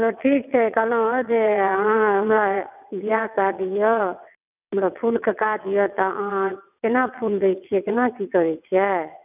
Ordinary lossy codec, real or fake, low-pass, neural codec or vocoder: none; fake; 3.6 kHz; vocoder, 22.05 kHz, 80 mel bands, WaveNeXt